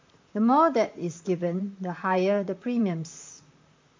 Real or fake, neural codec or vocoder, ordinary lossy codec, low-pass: fake; vocoder, 44.1 kHz, 128 mel bands, Pupu-Vocoder; MP3, 64 kbps; 7.2 kHz